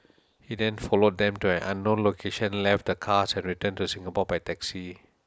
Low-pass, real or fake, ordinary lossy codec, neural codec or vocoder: none; real; none; none